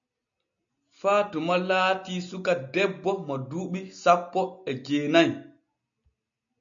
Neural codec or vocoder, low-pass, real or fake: none; 7.2 kHz; real